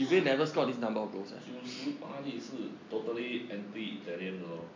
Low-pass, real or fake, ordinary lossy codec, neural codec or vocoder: 7.2 kHz; real; MP3, 48 kbps; none